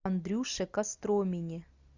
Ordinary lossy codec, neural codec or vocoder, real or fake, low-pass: Opus, 64 kbps; none; real; 7.2 kHz